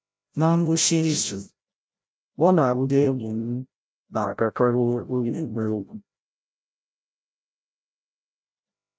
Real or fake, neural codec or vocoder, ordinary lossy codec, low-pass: fake; codec, 16 kHz, 0.5 kbps, FreqCodec, larger model; none; none